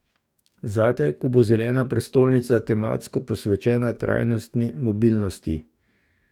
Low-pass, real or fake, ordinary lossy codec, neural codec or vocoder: 19.8 kHz; fake; none; codec, 44.1 kHz, 2.6 kbps, DAC